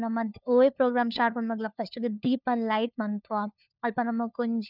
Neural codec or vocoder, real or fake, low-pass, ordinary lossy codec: codec, 16 kHz, 4 kbps, FunCodec, trained on LibriTTS, 50 frames a second; fake; 5.4 kHz; none